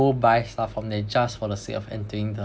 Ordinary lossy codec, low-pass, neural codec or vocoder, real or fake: none; none; none; real